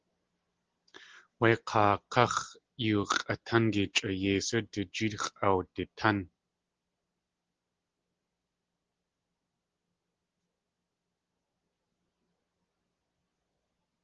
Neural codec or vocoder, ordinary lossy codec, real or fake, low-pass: none; Opus, 16 kbps; real; 7.2 kHz